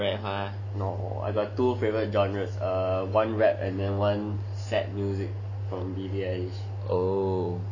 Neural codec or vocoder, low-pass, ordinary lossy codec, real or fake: none; 7.2 kHz; none; real